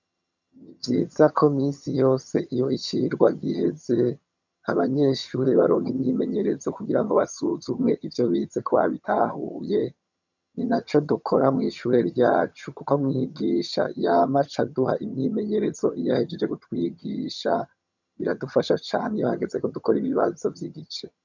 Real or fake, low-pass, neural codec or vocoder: fake; 7.2 kHz; vocoder, 22.05 kHz, 80 mel bands, HiFi-GAN